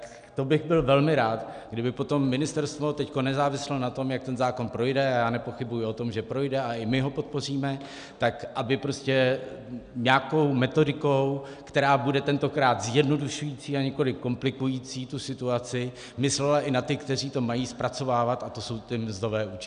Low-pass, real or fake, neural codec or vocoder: 9.9 kHz; real; none